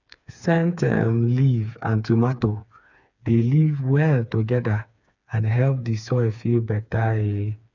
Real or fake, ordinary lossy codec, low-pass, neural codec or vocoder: fake; none; 7.2 kHz; codec, 16 kHz, 4 kbps, FreqCodec, smaller model